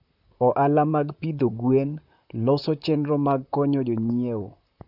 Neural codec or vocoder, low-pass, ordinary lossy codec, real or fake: vocoder, 44.1 kHz, 128 mel bands, Pupu-Vocoder; 5.4 kHz; none; fake